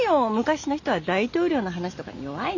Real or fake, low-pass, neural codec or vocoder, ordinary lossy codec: real; 7.2 kHz; none; none